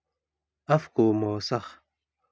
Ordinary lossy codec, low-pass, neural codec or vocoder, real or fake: none; none; none; real